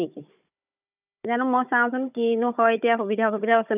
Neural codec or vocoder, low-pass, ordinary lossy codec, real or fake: codec, 16 kHz, 16 kbps, FunCodec, trained on Chinese and English, 50 frames a second; 3.6 kHz; none; fake